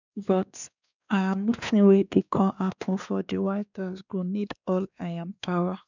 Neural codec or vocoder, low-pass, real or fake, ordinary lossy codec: codec, 16 kHz, 2 kbps, X-Codec, WavLM features, trained on Multilingual LibriSpeech; 7.2 kHz; fake; none